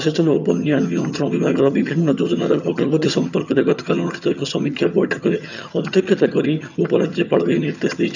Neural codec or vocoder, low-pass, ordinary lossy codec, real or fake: vocoder, 22.05 kHz, 80 mel bands, HiFi-GAN; 7.2 kHz; none; fake